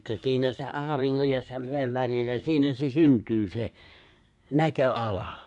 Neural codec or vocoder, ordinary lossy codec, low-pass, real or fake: codec, 24 kHz, 1 kbps, SNAC; none; 10.8 kHz; fake